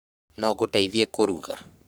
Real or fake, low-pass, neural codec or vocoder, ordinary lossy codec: fake; none; codec, 44.1 kHz, 3.4 kbps, Pupu-Codec; none